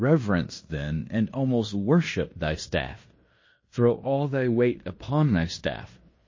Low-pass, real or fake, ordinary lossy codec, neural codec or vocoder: 7.2 kHz; fake; MP3, 32 kbps; codec, 16 kHz in and 24 kHz out, 0.9 kbps, LongCat-Audio-Codec, fine tuned four codebook decoder